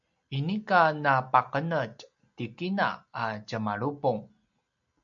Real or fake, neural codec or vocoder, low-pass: real; none; 7.2 kHz